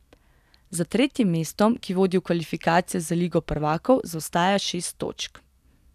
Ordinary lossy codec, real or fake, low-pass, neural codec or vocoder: none; real; 14.4 kHz; none